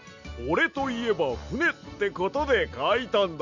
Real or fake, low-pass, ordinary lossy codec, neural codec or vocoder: real; 7.2 kHz; none; none